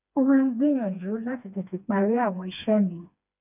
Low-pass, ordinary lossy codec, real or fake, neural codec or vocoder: 3.6 kHz; none; fake; codec, 16 kHz, 2 kbps, FreqCodec, smaller model